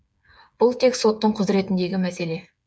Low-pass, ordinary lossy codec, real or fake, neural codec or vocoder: none; none; fake; codec, 16 kHz, 8 kbps, FreqCodec, smaller model